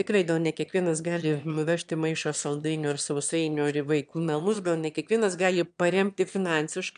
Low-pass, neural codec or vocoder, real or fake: 9.9 kHz; autoencoder, 22.05 kHz, a latent of 192 numbers a frame, VITS, trained on one speaker; fake